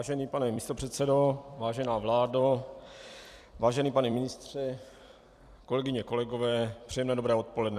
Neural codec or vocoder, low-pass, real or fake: vocoder, 44.1 kHz, 128 mel bands every 256 samples, BigVGAN v2; 14.4 kHz; fake